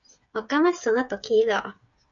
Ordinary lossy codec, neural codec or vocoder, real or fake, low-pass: MP3, 48 kbps; codec, 16 kHz, 8 kbps, FreqCodec, smaller model; fake; 7.2 kHz